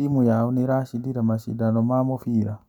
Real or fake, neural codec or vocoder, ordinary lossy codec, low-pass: real; none; none; 19.8 kHz